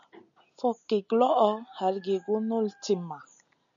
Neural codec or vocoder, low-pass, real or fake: none; 7.2 kHz; real